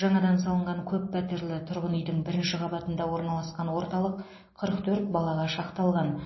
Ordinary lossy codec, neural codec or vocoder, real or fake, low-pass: MP3, 24 kbps; none; real; 7.2 kHz